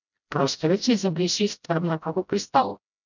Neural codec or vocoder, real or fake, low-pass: codec, 16 kHz, 0.5 kbps, FreqCodec, smaller model; fake; 7.2 kHz